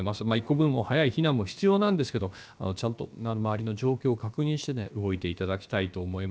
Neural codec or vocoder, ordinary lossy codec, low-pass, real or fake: codec, 16 kHz, about 1 kbps, DyCAST, with the encoder's durations; none; none; fake